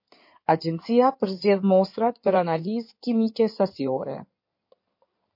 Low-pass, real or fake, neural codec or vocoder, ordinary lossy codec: 5.4 kHz; fake; codec, 16 kHz in and 24 kHz out, 2.2 kbps, FireRedTTS-2 codec; MP3, 32 kbps